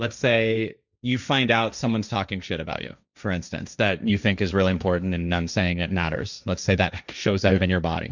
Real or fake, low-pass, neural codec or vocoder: fake; 7.2 kHz; codec, 16 kHz, 1.1 kbps, Voila-Tokenizer